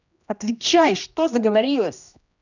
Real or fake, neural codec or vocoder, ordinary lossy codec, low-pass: fake; codec, 16 kHz, 1 kbps, X-Codec, HuBERT features, trained on general audio; none; 7.2 kHz